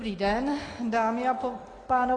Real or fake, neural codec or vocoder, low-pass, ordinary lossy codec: real; none; 9.9 kHz; AAC, 32 kbps